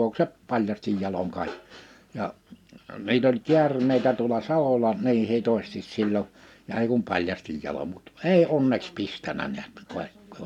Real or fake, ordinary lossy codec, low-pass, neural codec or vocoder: real; none; 19.8 kHz; none